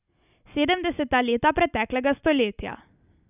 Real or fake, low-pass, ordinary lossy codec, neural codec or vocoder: real; 3.6 kHz; none; none